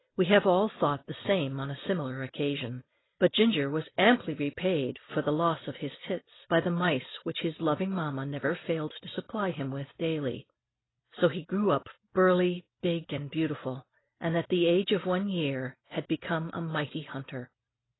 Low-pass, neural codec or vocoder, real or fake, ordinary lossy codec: 7.2 kHz; none; real; AAC, 16 kbps